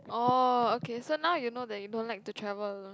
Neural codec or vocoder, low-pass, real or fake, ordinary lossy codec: none; none; real; none